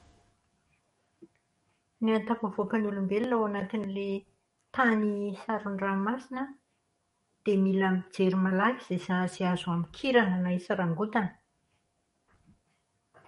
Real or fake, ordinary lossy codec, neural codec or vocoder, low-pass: fake; MP3, 48 kbps; codec, 44.1 kHz, 7.8 kbps, DAC; 19.8 kHz